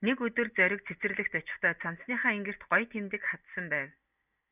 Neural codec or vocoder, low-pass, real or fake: none; 3.6 kHz; real